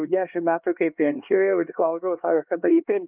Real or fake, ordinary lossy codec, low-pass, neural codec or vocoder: fake; Opus, 24 kbps; 3.6 kHz; codec, 16 kHz, 2 kbps, X-Codec, HuBERT features, trained on LibriSpeech